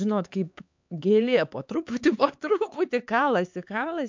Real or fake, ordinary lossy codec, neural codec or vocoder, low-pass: fake; MP3, 64 kbps; codec, 16 kHz, 4 kbps, X-Codec, HuBERT features, trained on LibriSpeech; 7.2 kHz